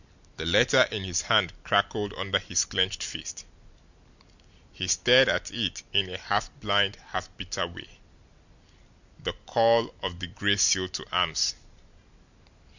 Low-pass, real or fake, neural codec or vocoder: 7.2 kHz; real; none